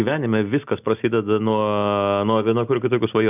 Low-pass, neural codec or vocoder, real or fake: 3.6 kHz; none; real